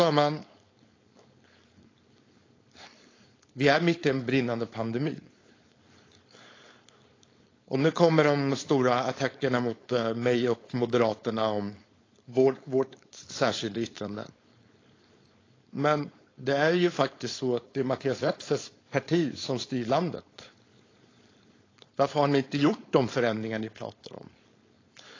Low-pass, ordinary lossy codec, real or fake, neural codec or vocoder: 7.2 kHz; AAC, 32 kbps; fake; codec, 16 kHz, 4.8 kbps, FACodec